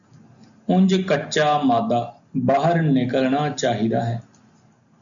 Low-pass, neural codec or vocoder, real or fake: 7.2 kHz; none; real